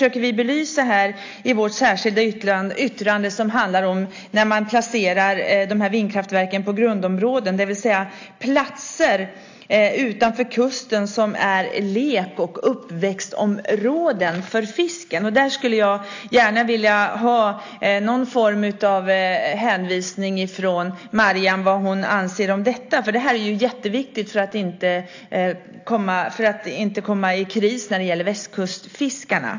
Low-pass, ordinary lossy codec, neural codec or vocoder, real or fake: 7.2 kHz; AAC, 48 kbps; none; real